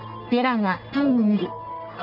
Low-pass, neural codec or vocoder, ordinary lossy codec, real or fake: 5.4 kHz; codec, 44.1 kHz, 1.7 kbps, Pupu-Codec; none; fake